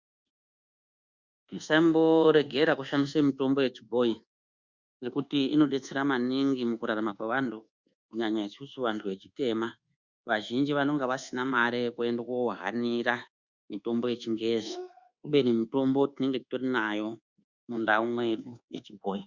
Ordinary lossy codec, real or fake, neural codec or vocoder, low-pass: Opus, 64 kbps; fake; codec, 24 kHz, 1.2 kbps, DualCodec; 7.2 kHz